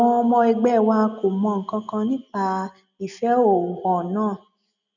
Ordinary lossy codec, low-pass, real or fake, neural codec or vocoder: none; 7.2 kHz; real; none